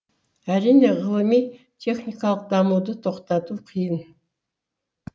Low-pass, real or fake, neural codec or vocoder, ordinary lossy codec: none; real; none; none